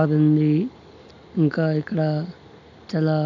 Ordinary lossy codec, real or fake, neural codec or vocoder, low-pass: none; real; none; 7.2 kHz